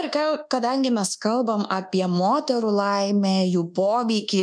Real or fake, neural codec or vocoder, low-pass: fake; codec, 24 kHz, 1.2 kbps, DualCodec; 9.9 kHz